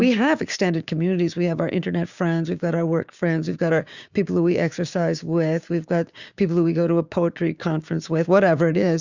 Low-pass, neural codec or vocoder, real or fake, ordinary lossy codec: 7.2 kHz; codec, 16 kHz, 6 kbps, DAC; fake; Opus, 64 kbps